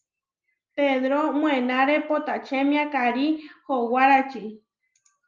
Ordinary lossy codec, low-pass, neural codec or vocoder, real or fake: Opus, 24 kbps; 7.2 kHz; none; real